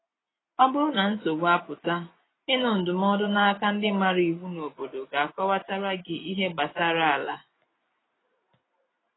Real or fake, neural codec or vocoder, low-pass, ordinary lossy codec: real; none; 7.2 kHz; AAC, 16 kbps